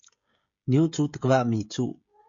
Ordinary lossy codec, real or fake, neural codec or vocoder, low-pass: MP3, 48 kbps; fake; codec, 16 kHz, 16 kbps, FreqCodec, smaller model; 7.2 kHz